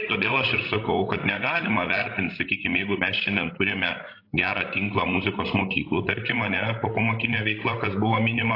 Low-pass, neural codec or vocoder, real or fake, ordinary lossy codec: 5.4 kHz; codec, 16 kHz, 16 kbps, FreqCodec, larger model; fake; AAC, 32 kbps